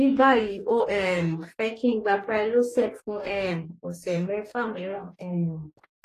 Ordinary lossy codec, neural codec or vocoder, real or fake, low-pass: AAC, 48 kbps; codec, 44.1 kHz, 2.6 kbps, DAC; fake; 14.4 kHz